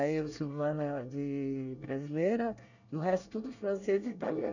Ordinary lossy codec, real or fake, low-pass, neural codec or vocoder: none; fake; 7.2 kHz; codec, 24 kHz, 1 kbps, SNAC